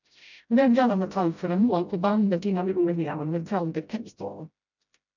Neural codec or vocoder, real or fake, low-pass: codec, 16 kHz, 0.5 kbps, FreqCodec, smaller model; fake; 7.2 kHz